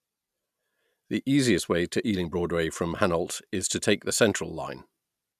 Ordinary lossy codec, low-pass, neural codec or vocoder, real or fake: none; 14.4 kHz; none; real